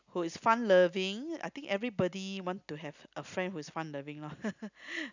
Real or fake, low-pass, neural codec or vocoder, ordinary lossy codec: real; 7.2 kHz; none; none